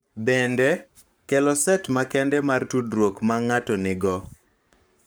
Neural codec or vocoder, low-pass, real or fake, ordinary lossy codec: codec, 44.1 kHz, 7.8 kbps, Pupu-Codec; none; fake; none